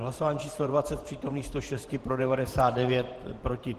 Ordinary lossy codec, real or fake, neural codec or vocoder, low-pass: Opus, 16 kbps; fake; vocoder, 48 kHz, 128 mel bands, Vocos; 14.4 kHz